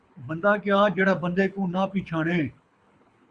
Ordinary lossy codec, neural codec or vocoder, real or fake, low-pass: Opus, 64 kbps; codec, 24 kHz, 6 kbps, HILCodec; fake; 9.9 kHz